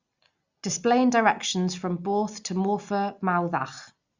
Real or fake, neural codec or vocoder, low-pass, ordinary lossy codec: real; none; 7.2 kHz; Opus, 64 kbps